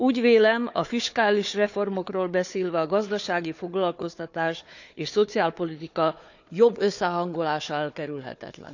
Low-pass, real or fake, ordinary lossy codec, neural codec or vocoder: 7.2 kHz; fake; none; codec, 16 kHz, 4 kbps, FunCodec, trained on Chinese and English, 50 frames a second